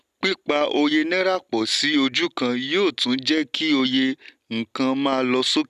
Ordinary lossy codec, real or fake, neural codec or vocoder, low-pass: none; real; none; 14.4 kHz